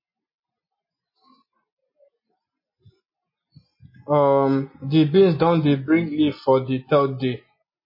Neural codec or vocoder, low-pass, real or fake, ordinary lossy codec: none; 5.4 kHz; real; MP3, 24 kbps